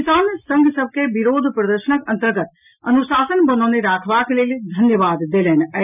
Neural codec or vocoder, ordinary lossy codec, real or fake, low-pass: none; none; real; 3.6 kHz